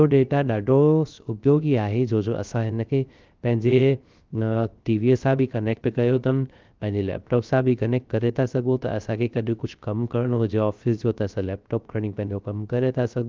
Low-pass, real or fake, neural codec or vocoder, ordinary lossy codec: 7.2 kHz; fake; codec, 16 kHz, 0.3 kbps, FocalCodec; Opus, 32 kbps